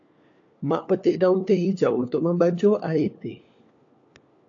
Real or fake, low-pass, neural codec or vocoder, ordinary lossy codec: fake; 7.2 kHz; codec, 16 kHz, 4 kbps, FunCodec, trained on LibriTTS, 50 frames a second; AAC, 48 kbps